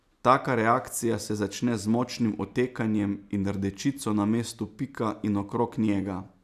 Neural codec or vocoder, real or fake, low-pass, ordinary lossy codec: none; real; 14.4 kHz; none